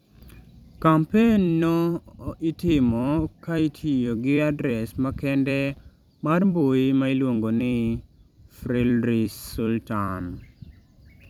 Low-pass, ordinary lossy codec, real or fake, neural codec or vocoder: 19.8 kHz; none; fake; vocoder, 44.1 kHz, 128 mel bands every 256 samples, BigVGAN v2